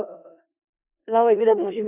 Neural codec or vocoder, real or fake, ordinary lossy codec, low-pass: codec, 16 kHz in and 24 kHz out, 0.4 kbps, LongCat-Audio-Codec, four codebook decoder; fake; none; 3.6 kHz